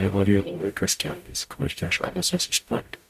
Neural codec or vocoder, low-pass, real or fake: codec, 44.1 kHz, 0.9 kbps, DAC; 14.4 kHz; fake